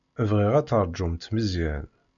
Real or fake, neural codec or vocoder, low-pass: real; none; 7.2 kHz